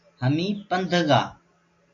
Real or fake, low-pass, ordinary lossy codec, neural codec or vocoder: real; 7.2 kHz; MP3, 48 kbps; none